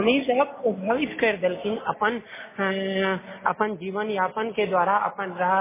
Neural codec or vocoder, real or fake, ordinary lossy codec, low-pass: none; real; MP3, 16 kbps; 3.6 kHz